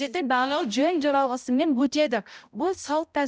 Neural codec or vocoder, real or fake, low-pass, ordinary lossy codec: codec, 16 kHz, 0.5 kbps, X-Codec, HuBERT features, trained on balanced general audio; fake; none; none